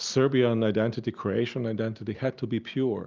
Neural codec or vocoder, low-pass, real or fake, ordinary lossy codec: none; 7.2 kHz; real; Opus, 24 kbps